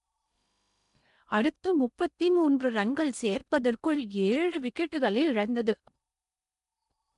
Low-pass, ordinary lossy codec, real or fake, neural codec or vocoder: 10.8 kHz; none; fake; codec, 16 kHz in and 24 kHz out, 0.8 kbps, FocalCodec, streaming, 65536 codes